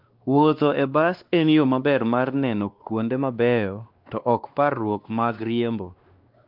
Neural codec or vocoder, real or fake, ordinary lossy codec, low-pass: codec, 16 kHz, 2 kbps, X-Codec, WavLM features, trained on Multilingual LibriSpeech; fake; Opus, 32 kbps; 5.4 kHz